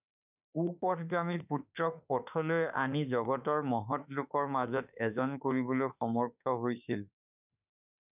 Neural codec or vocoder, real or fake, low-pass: autoencoder, 48 kHz, 32 numbers a frame, DAC-VAE, trained on Japanese speech; fake; 3.6 kHz